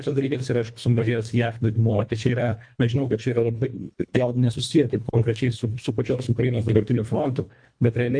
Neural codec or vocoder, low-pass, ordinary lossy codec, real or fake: codec, 24 kHz, 1.5 kbps, HILCodec; 9.9 kHz; MP3, 64 kbps; fake